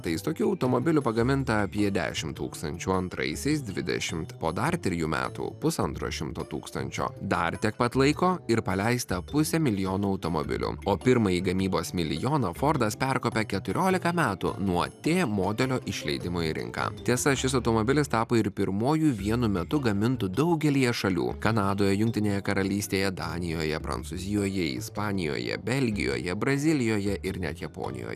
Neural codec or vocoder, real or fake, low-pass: none; real; 14.4 kHz